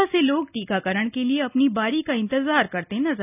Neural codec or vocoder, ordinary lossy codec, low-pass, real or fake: none; none; 3.6 kHz; real